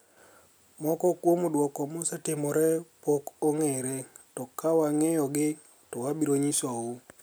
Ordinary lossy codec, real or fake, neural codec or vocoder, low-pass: none; real; none; none